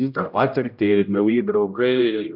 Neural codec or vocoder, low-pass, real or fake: codec, 16 kHz, 0.5 kbps, X-Codec, HuBERT features, trained on general audio; 5.4 kHz; fake